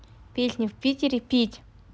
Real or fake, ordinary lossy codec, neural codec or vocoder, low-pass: real; none; none; none